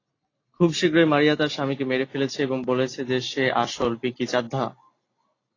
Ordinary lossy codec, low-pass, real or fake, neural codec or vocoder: AAC, 32 kbps; 7.2 kHz; real; none